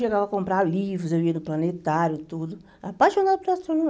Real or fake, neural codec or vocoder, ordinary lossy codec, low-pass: real; none; none; none